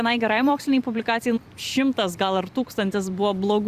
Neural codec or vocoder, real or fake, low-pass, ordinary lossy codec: vocoder, 44.1 kHz, 128 mel bands every 256 samples, BigVGAN v2; fake; 14.4 kHz; Opus, 64 kbps